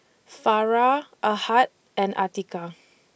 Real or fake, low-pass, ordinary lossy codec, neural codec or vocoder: real; none; none; none